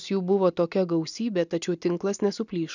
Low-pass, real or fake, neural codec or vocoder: 7.2 kHz; real; none